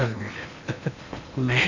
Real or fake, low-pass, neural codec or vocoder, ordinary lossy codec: fake; 7.2 kHz; codec, 16 kHz in and 24 kHz out, 0.8 kbps, FocalCodec, streaming, 65536 codes; none